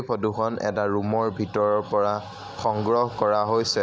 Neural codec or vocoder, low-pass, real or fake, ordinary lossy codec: none; none; real; none